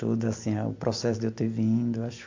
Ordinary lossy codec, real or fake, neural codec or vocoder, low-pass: MP3, 48 kbps; real; none; 7.2 kHz